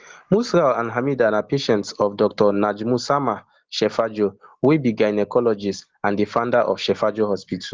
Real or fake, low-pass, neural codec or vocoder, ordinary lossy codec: real; 7.2 kHz; none; Opus, 16 kbps